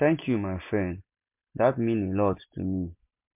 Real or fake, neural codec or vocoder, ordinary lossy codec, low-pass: real; none; MP3, 32 kbps; 3.6 kHz